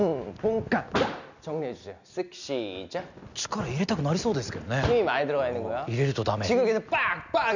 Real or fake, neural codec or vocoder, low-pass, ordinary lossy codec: real; none; 7.2 kHz; none